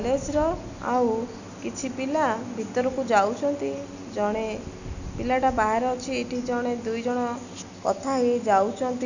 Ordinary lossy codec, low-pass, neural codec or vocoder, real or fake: none; 7.2 kHz; none; real